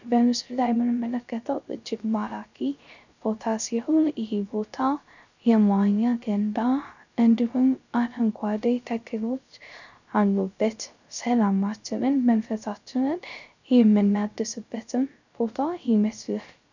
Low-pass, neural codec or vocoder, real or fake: 7.2 kHz; codec, 16 kHz, 0.3 kbps, FocalCodec; fake